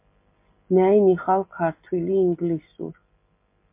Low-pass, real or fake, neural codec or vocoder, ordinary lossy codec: 3.6 kHz; real; none; MP3, 24 kbps